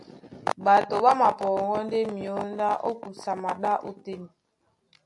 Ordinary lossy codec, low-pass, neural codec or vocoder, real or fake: AAC, 64 kbps; 10.8 kHz; none; real